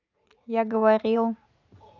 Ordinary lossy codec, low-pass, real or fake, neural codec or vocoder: none; 7.2 kHz; fake; vocoder, 44.1 kHz, 80 mel bands, Vocos